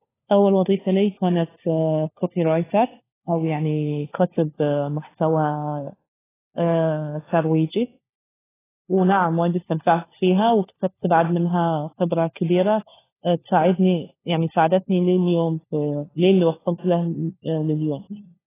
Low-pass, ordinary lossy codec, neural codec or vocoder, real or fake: 3.6 kHz; AAC, 16 kbps; codec, 16 kHz, 4 kbps, FunCodec, trained on LibriTTS, 50 frames a second; fake